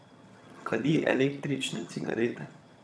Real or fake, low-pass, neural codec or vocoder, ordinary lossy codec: fake; none; vocoder, 22.05 kHz, 80 mel bands, HiFi-GAN; none